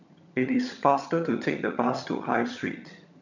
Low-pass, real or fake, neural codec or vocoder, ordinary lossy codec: 7.2 kHz; fake; vocoder, 22.05 kHz, 80 mel bands, HiFi-GAN; none